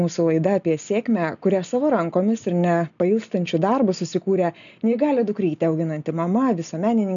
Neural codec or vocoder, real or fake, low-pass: none; real; 7.2 kHz